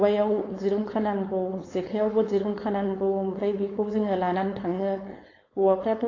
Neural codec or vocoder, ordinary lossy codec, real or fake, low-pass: codec, 16 kHz, 4.8 kbps, FACodec; AAC, 32 kbps; fake; 7.2 kHz